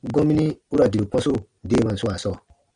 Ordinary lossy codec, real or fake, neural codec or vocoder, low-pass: Opus, 64 kbps; real; none; 9.9 kHz